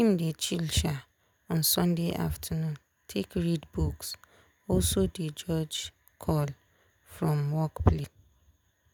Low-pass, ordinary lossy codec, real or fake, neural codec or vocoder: none; none; real; none